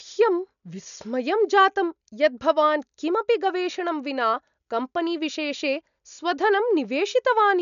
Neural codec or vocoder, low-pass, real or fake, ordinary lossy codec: none; 7.2 kHz; real; none